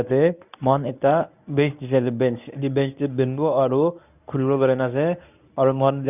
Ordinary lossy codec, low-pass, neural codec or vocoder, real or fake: none; 3.6 kHz; codec, 24 kHz, 0.9 kbps, WavTokenizer, medium speech release version 1; fake